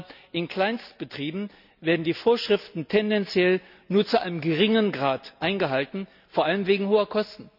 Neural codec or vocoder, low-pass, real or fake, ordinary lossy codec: none; 5.4 kHz; real; none